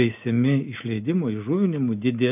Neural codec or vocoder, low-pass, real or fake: none; 3.6 kHz; real